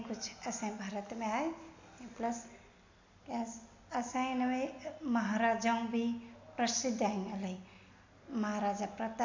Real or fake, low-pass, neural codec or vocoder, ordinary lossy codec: real; 7.2 kHz; none; MP3, 64 kbps